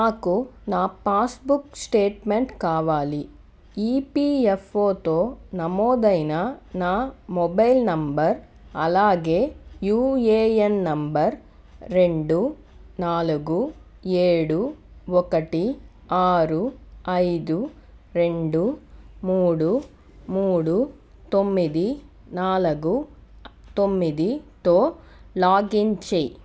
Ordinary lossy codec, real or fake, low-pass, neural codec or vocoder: none; real; none; none